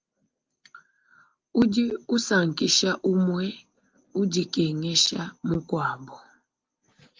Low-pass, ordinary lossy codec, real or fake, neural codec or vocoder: 7.2 kHz; Opus, 24 kbps; real; none